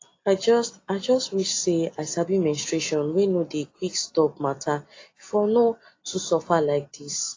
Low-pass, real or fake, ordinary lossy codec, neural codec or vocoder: 7.2 kHz; real; AAC, 32 kbps; none